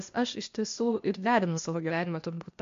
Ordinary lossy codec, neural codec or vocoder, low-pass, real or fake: MP3, 48 kbps; codec, 16 kHz, 0.8 kbps, ZipCodec; 7.2 kHz; fake